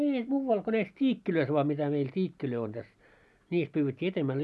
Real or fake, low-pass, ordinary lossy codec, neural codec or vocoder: real; none; none; none